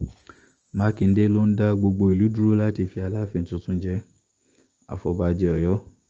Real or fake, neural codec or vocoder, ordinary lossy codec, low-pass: fake; codec, 16 kHz, 6 kbps, DAC; Opus, 24 kbps; 7.2 kHz